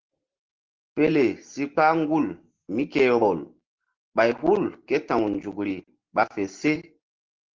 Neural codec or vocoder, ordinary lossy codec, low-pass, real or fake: none; Opus, 16 kbps; 7.2 kHz; real